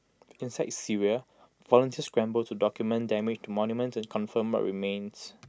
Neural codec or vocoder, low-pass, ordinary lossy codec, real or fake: none; none; none; real